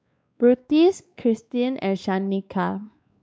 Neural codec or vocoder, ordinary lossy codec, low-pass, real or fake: codec, 16 kHz, 2 kbps, X-Codec, WavLM features, trained on Multilingual LibriSpeech; none; none; fake